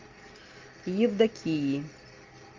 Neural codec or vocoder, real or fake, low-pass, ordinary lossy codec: none; real; 7.2 kHz; Opus, 24 kbps